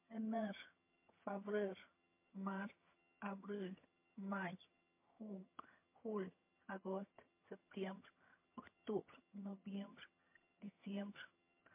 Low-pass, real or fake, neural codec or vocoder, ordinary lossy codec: 3.6 kHz; fake; vocoder, 22.05 kHz, 80 mel bands, HiFi-GAN; MP3, 24 kbps